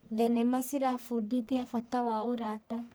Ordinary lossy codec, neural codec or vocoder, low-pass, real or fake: none; codec, 44.1 kHz, 1.7 kbps, Pupu-Codec; none; fake